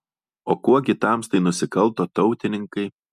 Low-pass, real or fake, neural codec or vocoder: 14.4 kHz; real; none